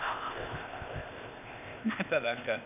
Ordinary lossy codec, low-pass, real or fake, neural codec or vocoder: none; 3.6 kHz; fake; codec, 16 kHz, 0.8 kbps, ZipCodec